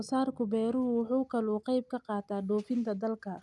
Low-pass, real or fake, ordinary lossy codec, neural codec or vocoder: none; real; none; none